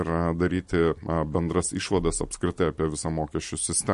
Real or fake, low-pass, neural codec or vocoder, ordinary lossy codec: real; 14.4 kHz; none; MP3, 48 kbps